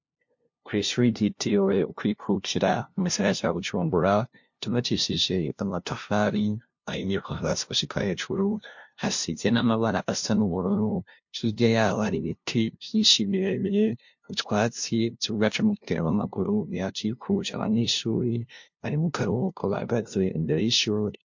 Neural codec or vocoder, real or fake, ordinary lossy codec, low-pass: codec, 16 kHz, 0.5 kbps, FunCodec, trained on LibriTTS, 25 frames a second; fake; MP3, 48 kbps; 7.2 kHz